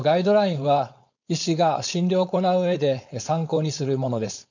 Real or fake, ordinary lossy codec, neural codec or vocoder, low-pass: fake; none; codec, 16 kHz, 4.8 kbps, FACodec; 7.2 kHz